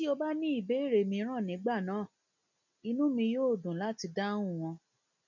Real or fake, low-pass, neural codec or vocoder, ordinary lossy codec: real; 7.2 kHz; none; none